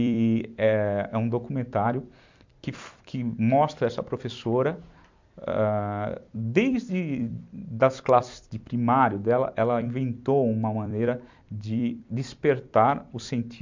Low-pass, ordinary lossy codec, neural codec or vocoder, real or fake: 7.2 kHz; MP3, 64 kbps; vocoder, 44.1 kHz, 128 mel bands every 256 samples, BigVGAN v2; fake